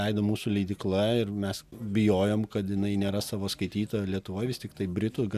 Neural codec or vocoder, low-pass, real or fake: none; 14.4 kHz; real